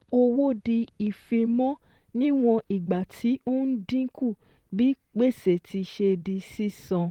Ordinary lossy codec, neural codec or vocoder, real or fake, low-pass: Opus, 24 kbps; vocoder, 44.1 kHz, 128 mel bands, Pupu-Vocoder; fake; 19.8 kHz